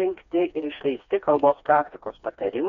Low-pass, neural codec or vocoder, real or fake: 7.2 kHz; codec, 16 kHz, 2 kbps, FreqCodec, smaller model; fake